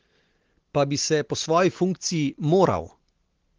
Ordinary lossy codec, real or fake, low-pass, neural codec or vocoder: Opus, 16 kbps; real; 7.2 kHz; none